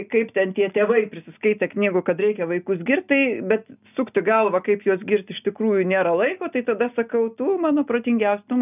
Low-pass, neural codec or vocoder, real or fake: 3.6 kHz; none; real